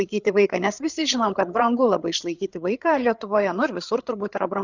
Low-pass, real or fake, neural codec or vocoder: 7.2 kHz; fake; codec, 16 kHz, 8 kbps, FreqCodec, larger model